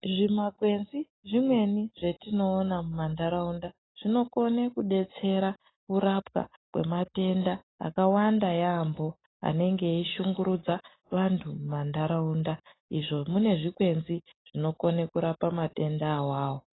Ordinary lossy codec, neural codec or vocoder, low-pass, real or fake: AAC, 16 kbps; none; 7.2 kHz; real